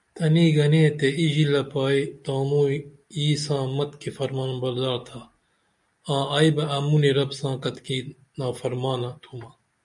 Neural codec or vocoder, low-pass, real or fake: none; 10.8 kHz; real